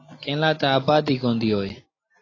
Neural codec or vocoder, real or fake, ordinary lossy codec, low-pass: none; real; AAC, 32 kbps; 7.2 kHz